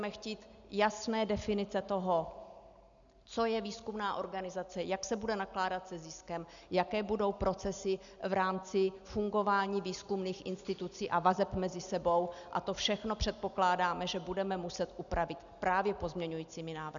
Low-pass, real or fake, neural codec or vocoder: 7.2 kHz; real; none